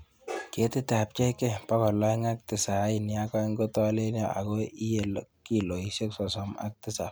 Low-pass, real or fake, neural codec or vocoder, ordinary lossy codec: none; real; none; none